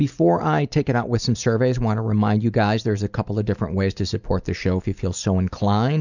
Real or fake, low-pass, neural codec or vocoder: real; 7.2 kHz; none